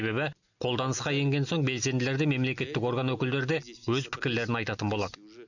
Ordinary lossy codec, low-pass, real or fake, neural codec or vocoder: none; 7.2 kHz; real; none